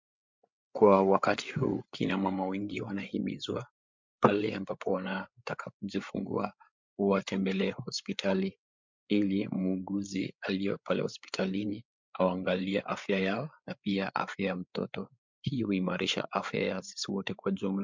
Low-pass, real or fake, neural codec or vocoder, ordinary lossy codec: 7.2 kHz; fake; codec, 16 kHz, 8 kbps, FreqCodec, larger model; MP3, 64 kbps